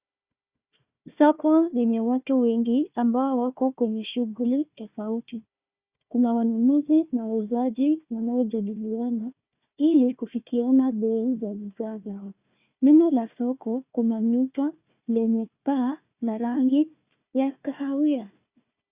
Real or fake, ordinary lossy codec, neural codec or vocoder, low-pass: fake; Opus, 64 kbps; codec, 16 kHz, 1 kbps, FunCodec, trained on Chinese and English, 50 frames a second; 3.6 kHz